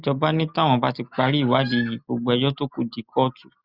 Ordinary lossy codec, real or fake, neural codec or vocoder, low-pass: Opus, 64 kbps; real; none; 5.4 kHz